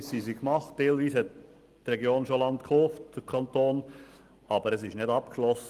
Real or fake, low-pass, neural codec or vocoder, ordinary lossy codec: real; 14.4 kHz; none; Opus, 24 kbps